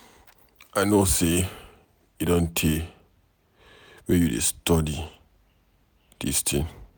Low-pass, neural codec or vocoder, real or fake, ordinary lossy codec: none; none; real; none